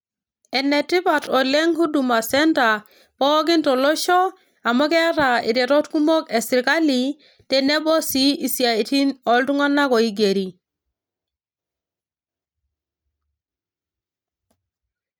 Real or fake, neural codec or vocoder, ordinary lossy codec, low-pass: real; none; none; none